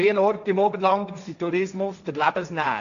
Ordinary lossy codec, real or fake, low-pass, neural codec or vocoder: none; fake; 7.2 kHz; codec, 16 kHz, 1.1 kbps, Voila-Tokenizer